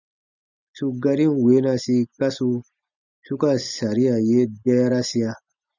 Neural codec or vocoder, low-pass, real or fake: none; 7.2 kHz; real